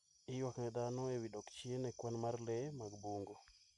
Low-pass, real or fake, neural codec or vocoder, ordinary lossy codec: 10.8 kHz; real; none; none